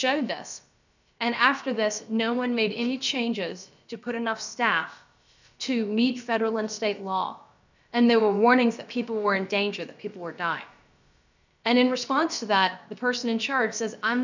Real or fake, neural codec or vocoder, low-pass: fake; codec, 16 kHz, about 1 kbps, DyCAST, with the encoder's durations; 7.2 kHz